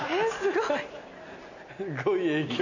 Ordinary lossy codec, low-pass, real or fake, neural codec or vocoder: none; 7.2 kHz; real; none